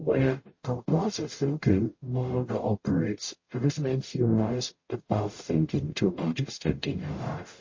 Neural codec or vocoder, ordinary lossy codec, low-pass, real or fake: codec, 44.1 kHz, 0.9 kbps, DAC; MP3, 32 kbps; 7.2 kHz; fake